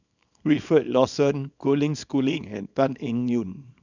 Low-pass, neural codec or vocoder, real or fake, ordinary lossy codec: 7.2 kHz; codec, 24 kHz, 0.9 kbps, WavTokenizer, small release; fake; none